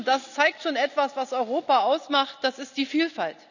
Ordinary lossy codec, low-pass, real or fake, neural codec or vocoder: none; 7.2 kHz; real; none